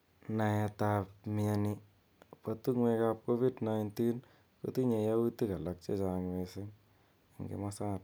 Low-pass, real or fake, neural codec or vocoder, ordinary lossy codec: none; real; none; none